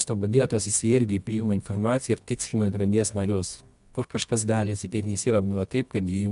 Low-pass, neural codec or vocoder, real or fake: 10.8 kHz; codec, 24 kHz, 0.9 kbps, WavTokenizer, medium music audio release; fake